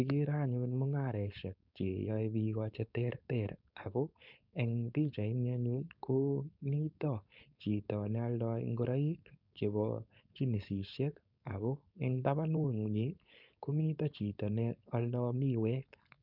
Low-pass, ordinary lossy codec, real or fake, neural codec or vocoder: 5.4 kHz; none; fake; codec, 16 kHz, 4.8 kbps, FACodec